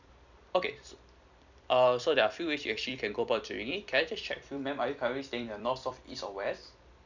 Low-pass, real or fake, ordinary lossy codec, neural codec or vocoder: 7.2 kHz; fake; none; vocoder, 44.1 kHz, 128 mel bands every 256 samples, BigVGAN v2